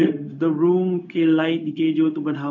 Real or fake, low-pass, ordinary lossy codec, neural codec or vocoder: fake; 7.2 kHz; none; codec, 16 kHz, 0.4 kbps, LongCat-Audio-Codec